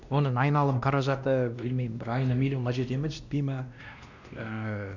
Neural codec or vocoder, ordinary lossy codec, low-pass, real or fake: codec, 16 kHz, 1 kbps, X-Codec, WavLM features, trained on Multilingual LibriSpeech; none; 7.2 kHz; fake